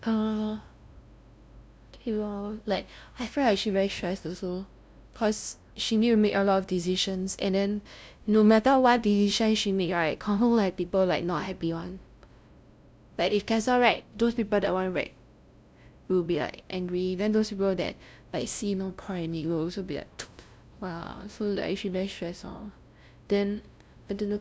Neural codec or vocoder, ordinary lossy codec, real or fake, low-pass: codec, 16 kHz, 0.5 kbps, FunCodec, trained on LibriTTS, 25 frames a second; none; fake; none